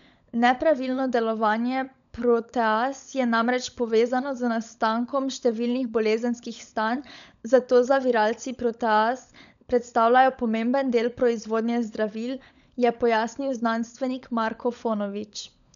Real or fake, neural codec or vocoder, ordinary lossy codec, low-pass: fake; codec, 16 kHz, 16 kbps, FunCodec, trained on LibriTTS, 50 frames a second; none; 7.2 kHz